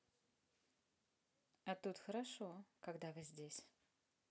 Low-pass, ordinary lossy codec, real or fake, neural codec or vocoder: none; none; real; none